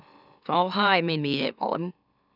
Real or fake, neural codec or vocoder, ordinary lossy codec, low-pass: fake; autoencoder, 44.1 kHz, a latent of 192 numbers a frame, MeloTTS; none; 5.4 kHz